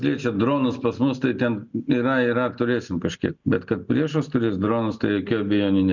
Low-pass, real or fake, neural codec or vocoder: 7.2 kHz; real; none